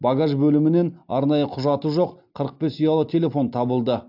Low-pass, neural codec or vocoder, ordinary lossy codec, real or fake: 5.4 kHz; none; AAC, 48 kbps; real